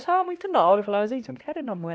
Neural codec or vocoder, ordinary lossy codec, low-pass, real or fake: codec, 16 kHz, 1 kbps, X-Codec, HuBERT features, trained on LibriSpeech; none; none; fake